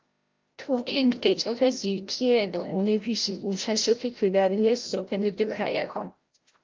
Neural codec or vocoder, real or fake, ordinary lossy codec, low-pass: codec, 16 kHz, 0.5 kbps, FreqCodec, larger model; fake; Opus, 32 kbps; 7.2 kHz